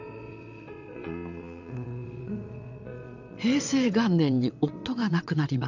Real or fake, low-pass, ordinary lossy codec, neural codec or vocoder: fake; 7.2 kHz; none; vocoder, 22.05 kHz, 80 mel bands, WaveNeXt